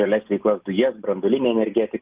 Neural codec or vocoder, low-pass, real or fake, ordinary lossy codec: none; 3.6 kHz; real; Opus, 32 kbps